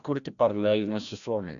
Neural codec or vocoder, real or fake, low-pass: codec, 16 kHz, 1 kbps, FreqCodec, larger model; fake; 7.2 kHz